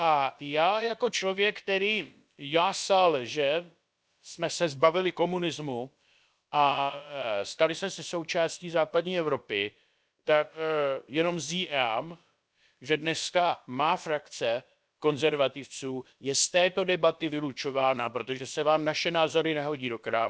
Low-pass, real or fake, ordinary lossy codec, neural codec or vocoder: none; fake; none; codec, 16 kHz, about 1 kbps, DyCAST, with the encoder's durations